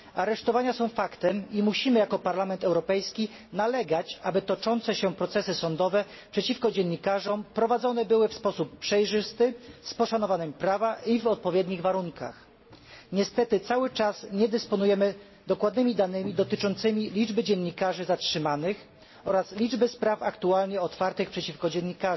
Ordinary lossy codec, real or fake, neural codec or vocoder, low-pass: MP3, 24 kbps; real; none; 7.2 kHz